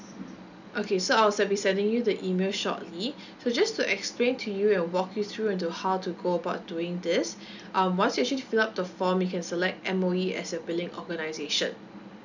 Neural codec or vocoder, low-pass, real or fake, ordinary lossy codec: none; 7.2 kHz; real; none